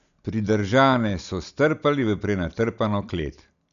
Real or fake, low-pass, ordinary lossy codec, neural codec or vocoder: real; 7.2 kHz; none; none